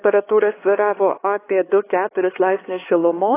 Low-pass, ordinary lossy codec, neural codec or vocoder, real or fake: 3.6 kHz; AAC, 16 kbps; codec, 16 kHz, 4 kbps, X-Codec, HuBERT features, trained on LibriSpeech; fake